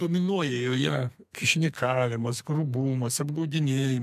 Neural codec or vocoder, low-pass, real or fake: codec, 32 kHz, 1.9 kbps, SNAC; 14.4 kHz; fake